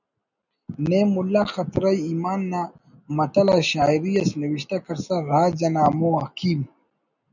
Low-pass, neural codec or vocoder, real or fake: 7.2 kHz; none; real